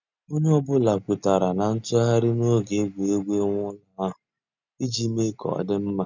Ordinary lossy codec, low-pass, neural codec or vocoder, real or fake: none; 7.2 kHz; none; real